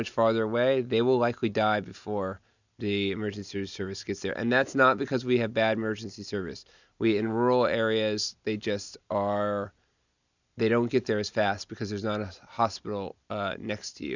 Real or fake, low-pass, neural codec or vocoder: real; 7.2 kHz; none